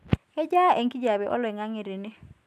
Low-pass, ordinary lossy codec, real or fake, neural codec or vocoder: 14.4 kHz; none; real; none